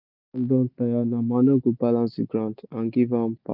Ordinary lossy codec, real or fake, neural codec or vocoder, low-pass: none; real; none; 5.4 kHz